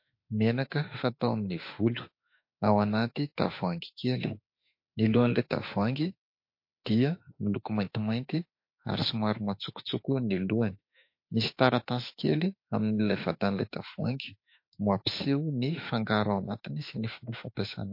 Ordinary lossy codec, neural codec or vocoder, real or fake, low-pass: MP3, 32 kbps; autoencoder, 48 kHz, 32 numbers a frame, DAC-VAE, trained on Japanese speech; fake; 5.4 kHz